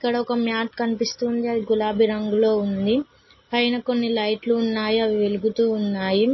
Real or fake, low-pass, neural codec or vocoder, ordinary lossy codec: real; 7.2 kHz; none; MP3, 24 kbps